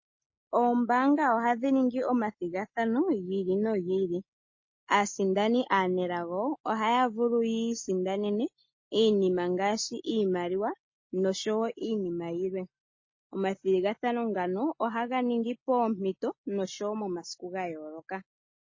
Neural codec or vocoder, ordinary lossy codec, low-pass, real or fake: none; MP3, 32 kbps; 7.2 kHz; real